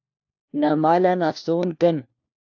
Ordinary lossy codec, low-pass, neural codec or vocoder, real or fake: AAC, 48 kbps; 7.2 kHz; codec, 16 kHz, 1 kbps, FunCodec, trained on LibriTTS, 50 frames a second; fake